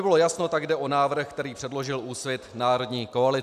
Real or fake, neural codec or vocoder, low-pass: real; none; 14.4 kHz